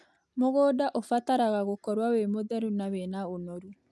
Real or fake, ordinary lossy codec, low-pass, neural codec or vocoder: real; none; none; none